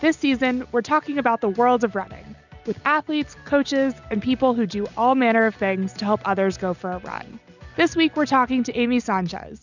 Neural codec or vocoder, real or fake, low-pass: none; real; 7.2 kHz